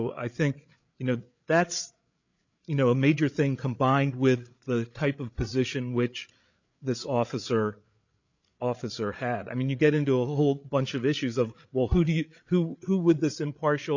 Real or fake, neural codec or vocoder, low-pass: fake; codec, 16 kHz, 8 kbps, FreqCodec, larger model; 7.2 kHz